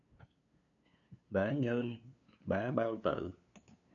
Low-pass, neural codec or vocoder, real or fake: 7.2 kHz; codec, 16 kHz, 2 kbps, FunCodec, trained on LibriTTS, 25 frames a second; fake